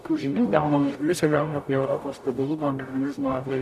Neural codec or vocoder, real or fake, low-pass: codec, 44.1 kHz, 0.9 kbps, DAC; fake; 14.4 kHz